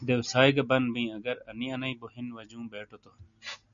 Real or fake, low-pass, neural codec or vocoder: real; 7.2 kHz; none